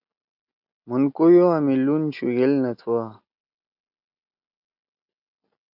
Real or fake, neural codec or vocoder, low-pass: real; none; 5.4 kHz